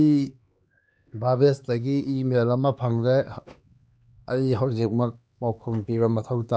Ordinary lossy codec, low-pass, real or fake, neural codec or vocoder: none; none; fake; codec, 16 kHz, 2 kbps, X-Codec, HuBERT features, trained on LibriSpeech